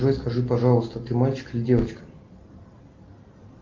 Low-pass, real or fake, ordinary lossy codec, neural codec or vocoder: 7.2 kHz; real; Opus, 24 kbps; none